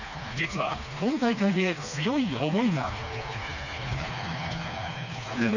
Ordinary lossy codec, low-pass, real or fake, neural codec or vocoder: none; 7.2 kHz; fake; codec, 16 kHz, 2 kbps, FreqCodec, smaller model